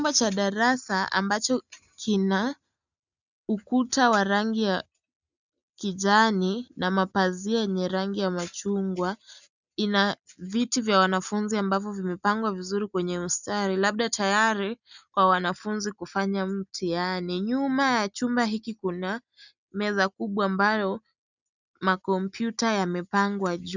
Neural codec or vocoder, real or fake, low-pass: none; real; 7.2 kHz